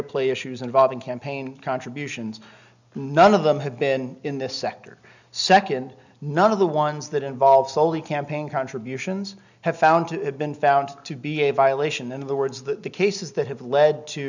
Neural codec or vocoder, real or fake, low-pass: none; real; 7.2 kHz